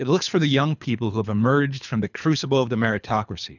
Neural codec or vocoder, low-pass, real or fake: codec, 24 kHz, 3 kbps, HILCodec; 7.2 kHz; fake